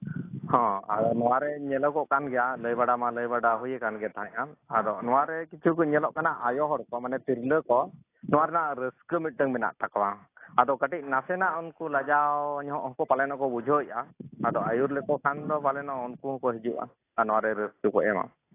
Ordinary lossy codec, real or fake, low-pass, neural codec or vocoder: AAC, 24 kbps; real; 3.6 kHz; none